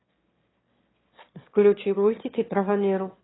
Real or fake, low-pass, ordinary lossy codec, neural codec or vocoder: fake; 7.2 kHz; AAC, 16 kbps; autoencoder, 22.05 kHz, a latent of 192 numbers a frame, VITS, trained on one speaker